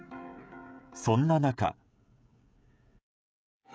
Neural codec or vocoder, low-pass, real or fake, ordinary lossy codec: codec, 16 kHz, 16 kbps, FreqCodec, smaller model; none; fake; none